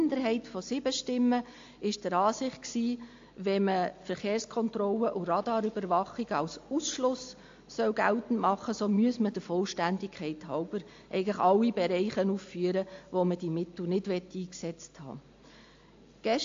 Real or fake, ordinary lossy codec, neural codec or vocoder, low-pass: real; AAC, 48 kbps; none; 7.2 kHz